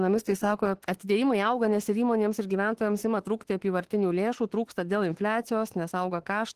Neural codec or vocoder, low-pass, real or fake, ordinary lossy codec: autoencoder, 48 kHz, 32 numbers a frame, DAC-VAE, trained on Japanese speech; 14.4 kHz; fake; Opus, 16 kbps